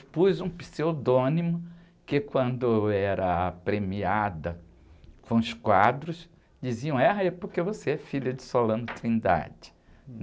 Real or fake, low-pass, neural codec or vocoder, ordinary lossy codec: real; none; none; none